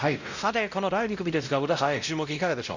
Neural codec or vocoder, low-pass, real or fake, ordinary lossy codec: codec, 16 kHz, 0.5 kbps, X-Codec, WavLM features, trained on Multilingual LibriSpeech; 7.2 kHz; fake; Opus, 64 kbps